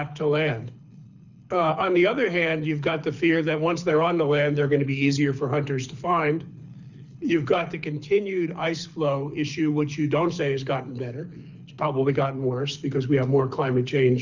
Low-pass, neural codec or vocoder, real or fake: 7.2 kHz; codec, 24 kHz, 6 kbps, HILCodec; fake